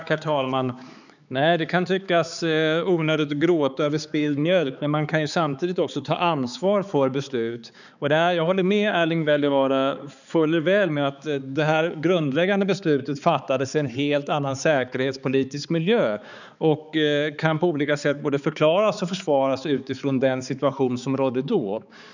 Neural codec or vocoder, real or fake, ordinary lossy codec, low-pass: codec, 16 kHz, 4 kbps, X-Codec, HuBERT features, trained on balanced general audio; fake; none; 7.2 kHz